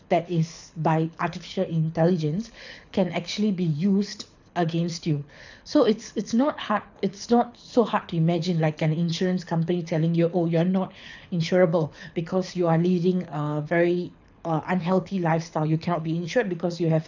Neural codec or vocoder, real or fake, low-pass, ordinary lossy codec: codec, 24 kHz, 6 kbps, HILCodec; fake; 7.2 kHz; none